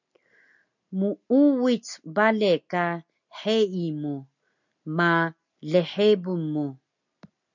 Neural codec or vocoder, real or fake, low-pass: none; real; 7.2 kHz